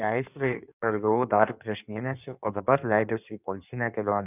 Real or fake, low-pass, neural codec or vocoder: fake; 3.6 kHz; codec, 16 kHz in and 24 kHz out, 1.1 kbps, FireRedTTS-2 codec